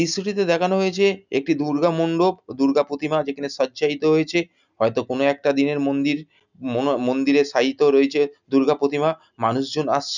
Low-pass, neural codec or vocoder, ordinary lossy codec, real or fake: 7.2 kHz; none; none; real